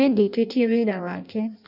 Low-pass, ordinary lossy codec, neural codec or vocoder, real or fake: 5.4 kHz; none; codec, 16 kHz in and 24 kHz out, 0.6 kbps, FireRedTTS-2 codec; fake